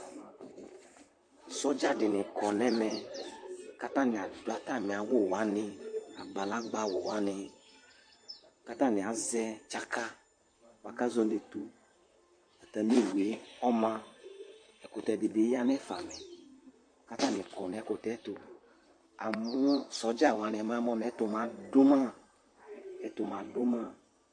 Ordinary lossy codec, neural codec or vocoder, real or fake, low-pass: MP3, 48 kbps; vocoder, 44.1 kHz, 128 mel bands, Pupu-Vocoder; fake; 9.9 kHz